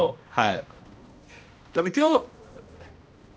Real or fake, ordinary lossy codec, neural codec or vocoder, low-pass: fake; none; codec, 16 kHz, 1 kbps, X-Codec, HuBERT features, trained on balanced general audio; none